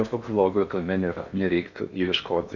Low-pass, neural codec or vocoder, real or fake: 7.2 kHz; codec, 16 kHz in and 24 kHz out, 0.8 kbps, FocalCodec, streaming, 65536 codes; fake